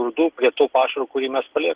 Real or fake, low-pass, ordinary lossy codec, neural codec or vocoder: real; 3.6 kHz; Opus, 16 kbps; none